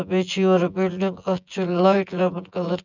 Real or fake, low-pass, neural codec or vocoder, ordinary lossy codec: fake; 7.2 kHz; vocoder, 24 kHz, 100 mel bands, Vocos; none